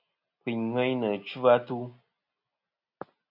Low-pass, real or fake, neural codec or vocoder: 5.4 kHz; real; none